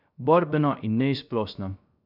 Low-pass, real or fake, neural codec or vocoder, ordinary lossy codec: 5.4 kHz; fake; codec, 16 kHz, 0.3 kbps, FocalCodec; none